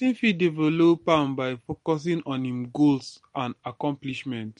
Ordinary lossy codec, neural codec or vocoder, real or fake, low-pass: MP3, 48 kbps; none; real; 10.8 kHz